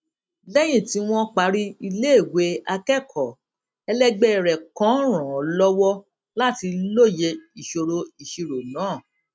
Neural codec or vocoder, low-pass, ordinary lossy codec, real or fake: none; none; none; real